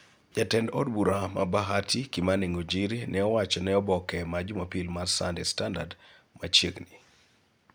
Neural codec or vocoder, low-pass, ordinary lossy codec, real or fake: none; none; none; real